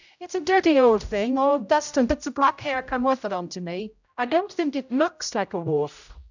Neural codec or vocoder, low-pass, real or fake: codec, 16 kHz, 0.5 kbps, X-Codec, HuBERT features, trained on general audio; 7.2 kHz; fake